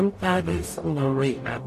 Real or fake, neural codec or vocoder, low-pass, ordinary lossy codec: fake; codec, 44.1 kHz, 0.9 kbps, DAC; 14.4 kHz; AAC, 64 kbps